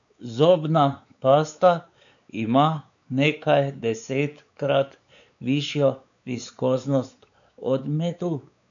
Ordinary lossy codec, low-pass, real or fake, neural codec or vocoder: none; 7.2 kHz; fake; codec, 16 kHz, 4 kbps, X-Codec, WavLM features, trained on Multilingual LibriSpeech